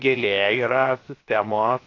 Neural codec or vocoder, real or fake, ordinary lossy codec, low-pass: codec, 16 kHz, 0.7 kbps, FocalCodec; fake; AAC, 32 kbps; 7.2 kHz